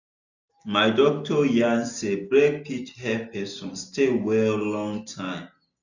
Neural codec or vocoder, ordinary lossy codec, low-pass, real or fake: none; none; 7.2 kHz; real